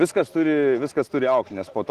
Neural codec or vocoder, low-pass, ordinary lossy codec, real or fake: none; 14.4 kHz; Opus, 16 kbps; real